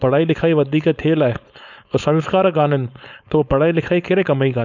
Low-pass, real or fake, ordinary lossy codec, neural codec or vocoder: 7.2 kHz; fake; none; codec, 16 kHz, 4.8 kbps, FACodec